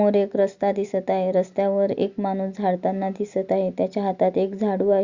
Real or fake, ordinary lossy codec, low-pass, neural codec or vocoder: real; Opus, 64 kbps; 7.2 kHz; none